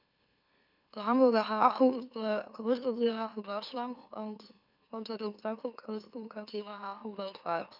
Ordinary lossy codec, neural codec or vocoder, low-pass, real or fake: none; autoencoder, 44.1 kHz, a latent of 192 numbers a frame, MeloTTS; 5.4 kHz; fake